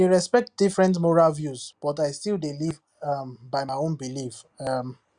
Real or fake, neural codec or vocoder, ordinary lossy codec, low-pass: real; none; Opus, 64 kbps; 9.9 kHz